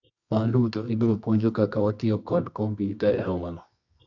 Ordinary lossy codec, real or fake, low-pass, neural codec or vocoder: none; fake; 7.2 kHz; codec, 24 kHz, 0.9 kbps, WavTokenizer, medium music audio release